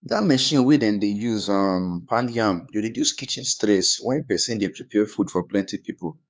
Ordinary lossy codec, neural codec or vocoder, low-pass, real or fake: none; codec, 16 kHz, 4 kbps, X-Codec, HuBERT features, trained on LibriSpeech; none; fake